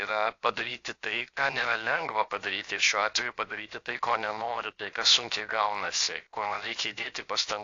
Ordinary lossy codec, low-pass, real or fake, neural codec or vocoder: AAC, 48 kbps; 7.2 kHz; fake; codec, 16 kHz, 0.7 kbps, FocalCodec